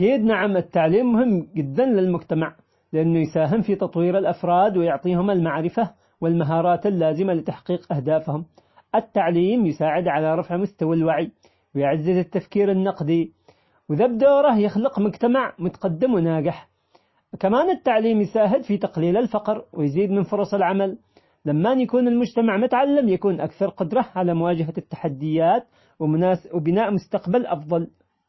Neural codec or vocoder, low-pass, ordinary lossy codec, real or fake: none; 7.2 kHz; MP3, 24 kbps; real